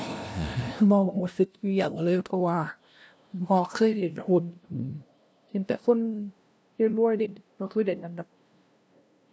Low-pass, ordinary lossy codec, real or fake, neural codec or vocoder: none; none; fake; codec, 16 kHz, 0.5 kbps, FunCodec, trained on LibriTTS, 25 frames a second